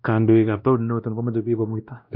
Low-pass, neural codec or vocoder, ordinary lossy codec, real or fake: 5.4 kHz; codec, 16 kHz, 1 kbps, X-Codec, WavLM features, trained on Multilingual LibriSpeech; none; fake